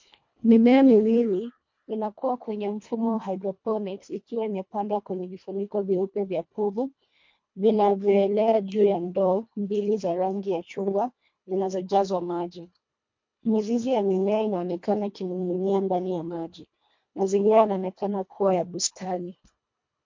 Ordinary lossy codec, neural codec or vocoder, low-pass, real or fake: MP3, 48 kbps; codec, 24 kHz, 1.5 kbps, HILCodec; 7.2 kHz; fake